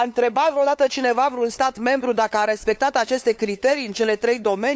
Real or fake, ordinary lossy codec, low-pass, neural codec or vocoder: fake; none; none; codec, 16 kHz, 8 kbps, FunCodec, trained on LibriTTS, 25 frames a second